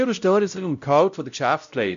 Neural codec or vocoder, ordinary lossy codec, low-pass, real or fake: codec, 16 kHz, 0.5 kbps, X-Codec, WavLM features, trained on Multilingual LibriSpeech; none; 7.2 kHz; fake